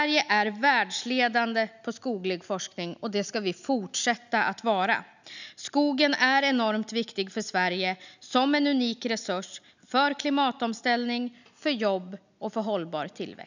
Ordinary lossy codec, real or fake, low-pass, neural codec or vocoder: none; real; 7.2 kHz; none